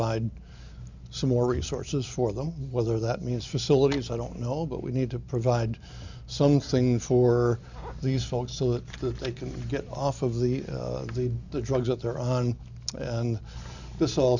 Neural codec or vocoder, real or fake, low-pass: none; real; 7.2 kHz